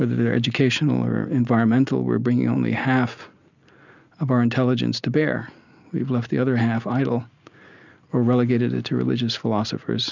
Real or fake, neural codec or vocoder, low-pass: real; none; 7.2 kHz